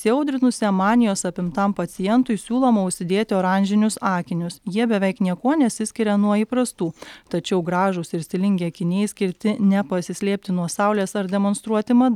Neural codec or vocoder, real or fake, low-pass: none; real; 19.8 kHz